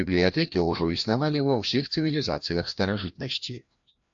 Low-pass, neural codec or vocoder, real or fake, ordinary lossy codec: 7.2 kHz; codec, 16 kHz, 1 kbps, FreqCodec, larger model; fake; AAC, 64 kbps